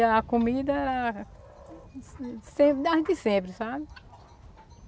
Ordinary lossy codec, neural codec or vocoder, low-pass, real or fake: none; none; none; real